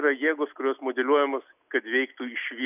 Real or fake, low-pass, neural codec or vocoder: real; 3.6 kHz; none